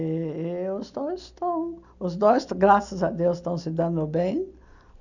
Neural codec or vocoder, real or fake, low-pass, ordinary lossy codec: none; real; 7.2 kHz; none